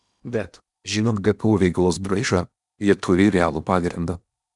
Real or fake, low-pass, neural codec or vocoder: fake; 10.8 kHz; codec, 16 kHz in and 24 kHz out, 0.8 kbps, FocalCodec, streaming, 65536 codes